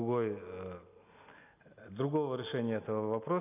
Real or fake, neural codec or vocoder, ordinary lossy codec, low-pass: real; none; none; 3.6 kHz